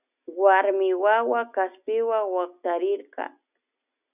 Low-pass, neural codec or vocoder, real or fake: 3.6 kHz; none; real